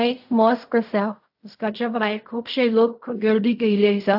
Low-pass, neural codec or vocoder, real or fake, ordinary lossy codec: 5.4 kHz; codec, 16 kHz in and 24 kHz out, 0.4 kbps, LongCat-Audio-Codec, fine tuned four codebook decoder; fake; AAC, 48 kbps